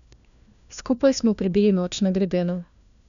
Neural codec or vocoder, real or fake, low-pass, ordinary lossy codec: codec, 16 kHz, 1 kbps, FunCodec, trained on LibriTTS, 50 frames a second; fake; 7.2 kHz; none